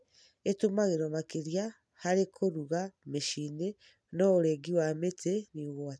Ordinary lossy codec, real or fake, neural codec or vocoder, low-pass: none; real; none; none